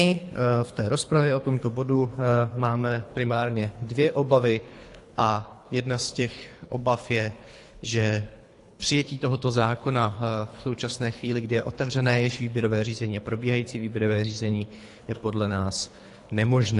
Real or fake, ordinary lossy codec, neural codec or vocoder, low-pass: fake; AAC, 48 kbps; codec, 24 kHz, 3 kbps, HILCodec; 10.8 kHz